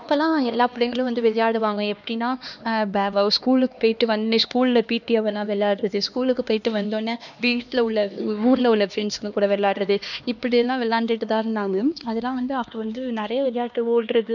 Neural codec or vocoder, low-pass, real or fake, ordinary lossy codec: codec, 16 kHz, 2 kbps, X-Codec, HuBERT features, trained on LibriSpeech; 7.2 kHz; fake; none